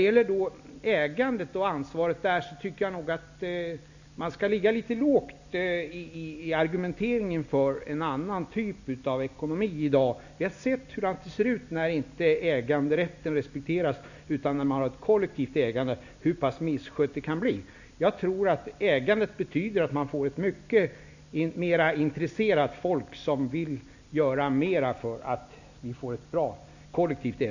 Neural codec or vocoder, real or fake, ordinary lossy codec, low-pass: none; real; none; 7.2 kHz